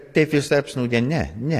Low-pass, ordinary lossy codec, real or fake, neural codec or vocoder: 14.4 kHz; AAC, 48 kbps; real; none